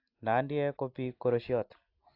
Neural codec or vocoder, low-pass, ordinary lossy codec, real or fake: none; 5.4 kHz; AAC, 32 kbps; real